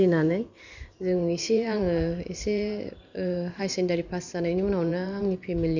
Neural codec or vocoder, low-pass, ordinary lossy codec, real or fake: vocoder, 44.1 kHz, 128 mel bands every 512 samples, BigVGAN v2; 7.2 kHz; none; fake